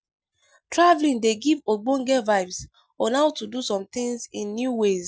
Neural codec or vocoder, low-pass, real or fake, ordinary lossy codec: none; none; real; none